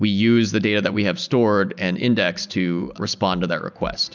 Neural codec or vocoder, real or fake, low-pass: none; real; 7.2 kHz